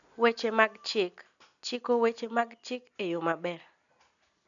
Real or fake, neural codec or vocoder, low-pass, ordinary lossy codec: real; none; 7.2 kHz; none